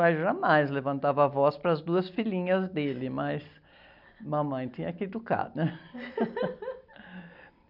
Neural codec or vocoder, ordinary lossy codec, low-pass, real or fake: none; none; 5.4 kHz; real